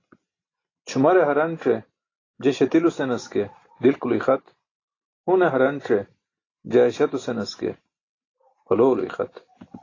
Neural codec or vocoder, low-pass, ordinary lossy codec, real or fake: none; 7.2 kHz; AAC, 32 kbps; real